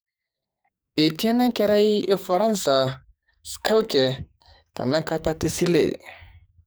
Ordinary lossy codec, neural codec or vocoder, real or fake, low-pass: none; codec, 44.1 kHz, 2.6 kbps, SNAC; fake; none